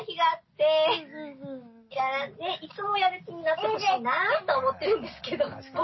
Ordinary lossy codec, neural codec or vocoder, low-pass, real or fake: MP3, 24 kbps; none; 7.2 kHz; real